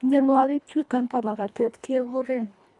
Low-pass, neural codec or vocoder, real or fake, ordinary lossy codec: 10.8 kHz; codec, 24 kHz, 1.5 kbps, HILCodec; fake; none